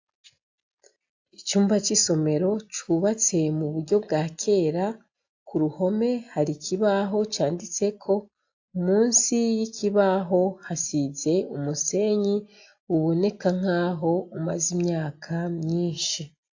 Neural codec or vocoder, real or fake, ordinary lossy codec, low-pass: none; real; AAC, 48 kbps; 7.2 kHz